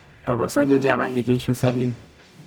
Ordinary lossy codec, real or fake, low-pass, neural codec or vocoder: none; fake; none; codec, 44.1 kHz, 0.9 kbps, DAC